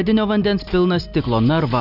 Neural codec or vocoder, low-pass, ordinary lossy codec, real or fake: none; 5.4 kHz; AAC, 48 kbps; real